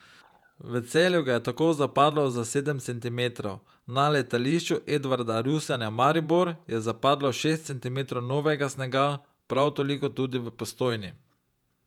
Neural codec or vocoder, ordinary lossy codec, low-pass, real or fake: vocoder, 48 kHz, 128 mel bands, Vocos; none; 19.8 kHz; fake